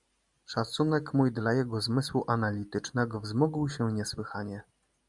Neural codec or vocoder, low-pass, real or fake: none; 10.8 kHz; real